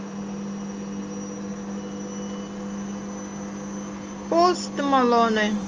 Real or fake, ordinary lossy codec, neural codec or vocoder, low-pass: real; Opus, 24 kbps; none; 7.2 kHz